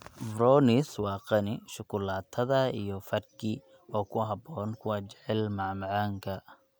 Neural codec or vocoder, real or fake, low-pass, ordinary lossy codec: none; real; none; none